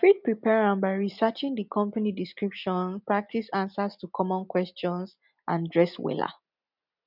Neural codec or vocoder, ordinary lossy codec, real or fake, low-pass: none; none; real; 5.4 kHz